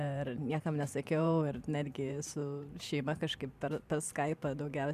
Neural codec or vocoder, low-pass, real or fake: vocoder, 44.1 kHz, 128 mel bands every 512 samples, BigVGAN v2; 14.4 kHz; fake